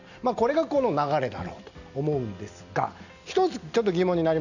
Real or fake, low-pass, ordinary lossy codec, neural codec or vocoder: real; 7.2 kHz; none; none